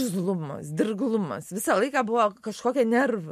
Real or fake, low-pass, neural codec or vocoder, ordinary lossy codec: real; 14.4 kHz; none; MP3, 64 kbps